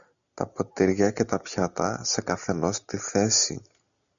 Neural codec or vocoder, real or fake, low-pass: none; real; 7.2 kHz